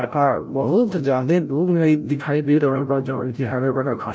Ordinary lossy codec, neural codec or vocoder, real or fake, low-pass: none; codec, 16 kHz, 0.5 kbps, FreqCodec, larger model; fake; none